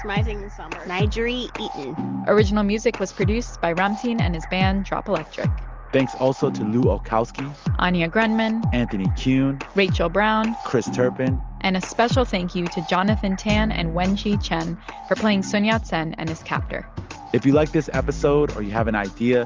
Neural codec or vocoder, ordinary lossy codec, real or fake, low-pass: none; Opus, 32 kbps; real; 7.2 kHz